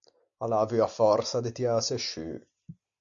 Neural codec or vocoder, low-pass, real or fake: none; 7.2 kHz; real